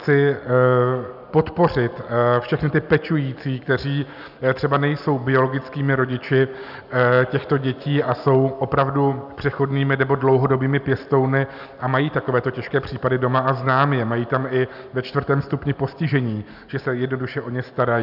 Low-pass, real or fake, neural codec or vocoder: 5.4 kHz; real; none